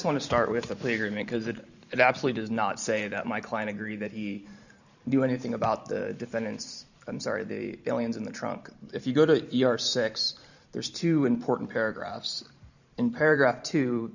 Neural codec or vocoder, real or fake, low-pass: vocoder, 44.1 kHz, 128 mel bands every 512 samples, BigVGAN v2; fake; 7.2 kHz